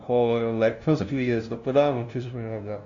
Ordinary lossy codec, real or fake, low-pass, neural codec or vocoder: Opus, 64 kbps; fake; 7.2 kHz; codec, 16 kHz, 0.5 kbps, FunCodec, trained on LibriTTS, 25 frames a second